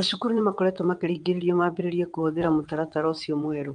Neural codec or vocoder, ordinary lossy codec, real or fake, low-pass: vocoder, 22.05 kHz, 80 mel bands, WaveNeXt; Opus, 32 kbps; fake; 9.9 kHz